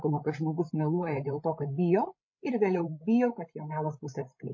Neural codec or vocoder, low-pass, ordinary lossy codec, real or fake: codec, 16 kHz, 16 kbps, FreqCodec, larger model; 7.2 kHz; MP3, 32 kbps; fake